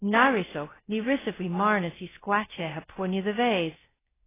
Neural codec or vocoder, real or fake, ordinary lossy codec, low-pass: codec, 16 kHz, 0.2 kbps, FocalCodec; fake; AAC, 16 kbps; 3.6 kHz